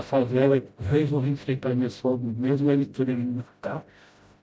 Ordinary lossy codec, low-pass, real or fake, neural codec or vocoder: none; none; fake; codec, 16 kHz, 0.5 kbps, FreqCodec, smaller model